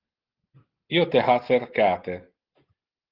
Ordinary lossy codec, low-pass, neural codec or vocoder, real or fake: Opus, 16 kbps; 5.4 kHz; none; real